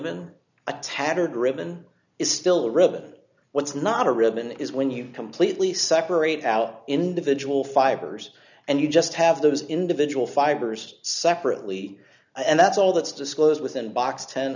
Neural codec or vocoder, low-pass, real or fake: none; 7.2 kHz; real